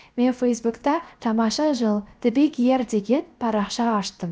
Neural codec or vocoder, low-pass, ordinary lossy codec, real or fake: codec, 16 kHz, 0.7 kbps, FocalCodec; none; none; fake